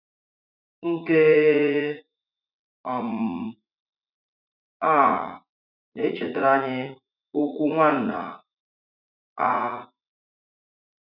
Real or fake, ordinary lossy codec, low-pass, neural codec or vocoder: fake; none; 5.4 kHz; vocoder, 44.1 kHz, 80 mel bands, Vocos